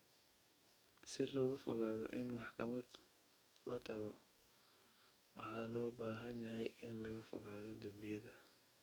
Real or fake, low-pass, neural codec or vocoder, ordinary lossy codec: fake; none; codec, 44.1 kHz, 2.6 kbps, DAC; none